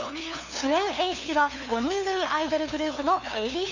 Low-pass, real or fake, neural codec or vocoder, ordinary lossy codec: 7.2 kHz; fake; codec, 16 kHz, 2 kbps, FunCodec, trained on LibriTTS, 25 frames a second; none